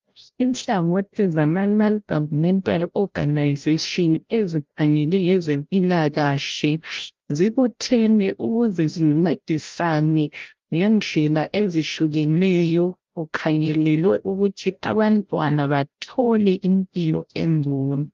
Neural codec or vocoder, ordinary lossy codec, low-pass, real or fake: codec, 16 kHz, 0.5 kbps, FreqCodec, larger model; Opus, 32 kbps; 7.2 kHz; fake